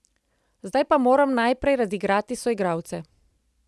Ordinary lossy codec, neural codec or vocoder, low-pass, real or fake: none; none; none; real